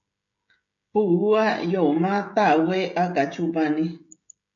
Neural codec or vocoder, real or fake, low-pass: codec, 16 kHz, 16 kbps, FreqCodec, smaller model; fake; 7.2 kHz